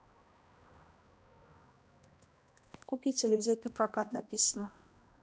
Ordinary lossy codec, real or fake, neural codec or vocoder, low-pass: none; fake; codec, 16 kHz, 1 kbps, X-Codec, HuBERT features, trained on balanced general audio; none